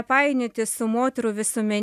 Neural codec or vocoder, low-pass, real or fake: none; 14.4 kHz; real